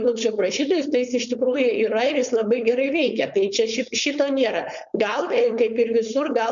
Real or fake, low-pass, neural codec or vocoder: fake; 7.2 kHz; codec, 16 kHz, 4.8 kbps, FACodec